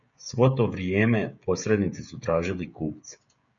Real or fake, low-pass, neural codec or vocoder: fake; 7.2 kHz; codec, 16 kHz, 16 kbps, FreqCodec, smaller model